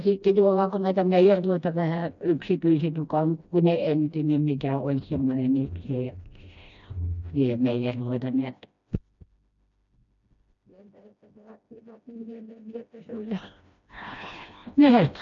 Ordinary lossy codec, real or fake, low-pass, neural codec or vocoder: none; fake; 7.2 kHz; codec, 16 kHz, 1 kbps, FreqCodec, smaller model